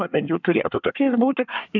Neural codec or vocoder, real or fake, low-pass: codec, 24 kHz, 1 kbps, SNAC; fake; 7.2 kHz